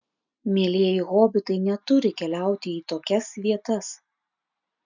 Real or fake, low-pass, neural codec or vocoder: real; 7.2 kHz; none